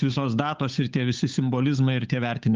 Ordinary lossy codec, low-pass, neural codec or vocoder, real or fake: Opus, 32 kbps; 7.2 kHz; codec, 16 kHz, 8 kbps, FunCodec, trained on Chinese and English, 25 frames a second; fake